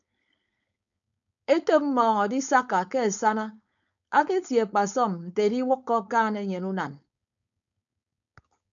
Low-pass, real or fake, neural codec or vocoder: 7.2 kHz; fake; codec, 16 kHz, 4.8 kbps, FACodec